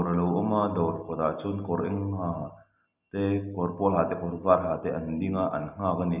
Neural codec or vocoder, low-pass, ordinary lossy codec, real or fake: none; 3.6 kHz; none; real